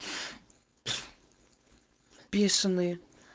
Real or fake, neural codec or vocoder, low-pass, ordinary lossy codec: fake; codec, 16 kHz, 4.8 kbps, FACodec; none; none